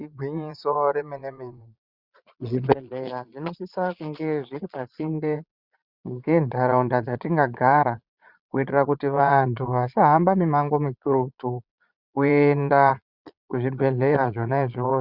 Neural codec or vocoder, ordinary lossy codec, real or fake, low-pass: vocoder, 22.05 kHz, 80 mel bands, Vocos; Opus, 64 kbps; fake; 5.4 kHz